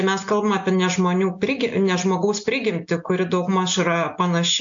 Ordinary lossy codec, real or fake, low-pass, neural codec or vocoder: AAC, 64 kbps; real; 7.2 kHz; none